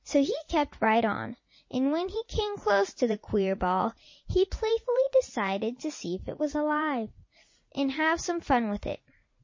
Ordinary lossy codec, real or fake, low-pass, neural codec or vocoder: MP3, 32 kbps; fake; 7.2 kHz; vocoder, 44.1 kHz, 80 mel bands, Vocos